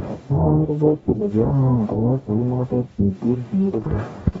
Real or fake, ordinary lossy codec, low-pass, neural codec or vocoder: fake; AAC, 24 kbps; 19.8 kHz; codec, 44.1 kHz, 0.9 kbps, DAC